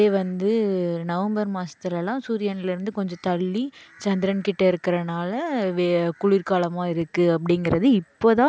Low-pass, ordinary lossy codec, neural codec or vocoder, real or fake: none; none; none; real